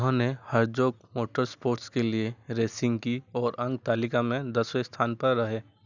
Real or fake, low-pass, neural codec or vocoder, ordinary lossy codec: real; 7.2 kHz; none; none